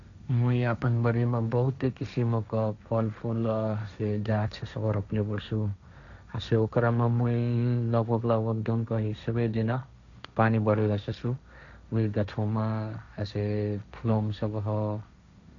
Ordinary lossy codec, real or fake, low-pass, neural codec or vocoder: none; fake; 7.2 kHz; codec, 16 kHz, 1.1 kbps, Voila-Tokenizer